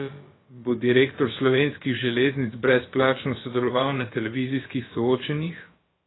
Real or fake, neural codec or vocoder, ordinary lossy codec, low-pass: fake; codec, 16 kHz, about 1 kbps, DyCAST, with the encoder's durations; AAC, 16 kbps; 7.2 kHz